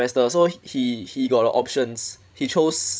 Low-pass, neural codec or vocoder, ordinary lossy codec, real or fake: none; codec, 16 kHz, 16 kbps, FreqCodec, larger model; none; fake